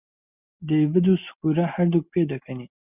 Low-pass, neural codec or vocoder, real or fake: 3.6 kHz; none; real